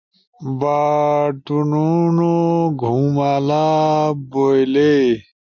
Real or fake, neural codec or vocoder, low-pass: real; none; 7.2 kHz